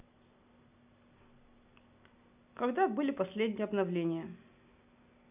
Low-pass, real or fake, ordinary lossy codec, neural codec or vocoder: 3.6 kHz; real; none; none